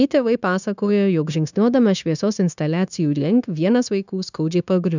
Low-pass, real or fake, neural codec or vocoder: 7.2 kHz; fake; codec, 16 kHz, 0.9 kbps, LongCat-Audio-Codec